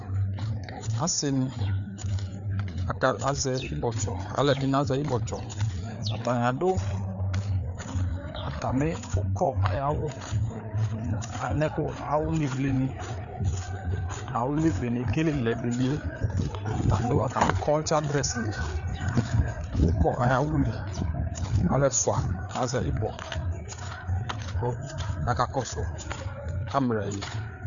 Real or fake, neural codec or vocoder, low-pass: fake; codec, 16 kHz, 4 kbps, FreqCodec, larger model; 7.2 kHz